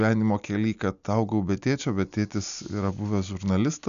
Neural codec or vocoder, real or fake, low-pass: none; real; 7.2 kHz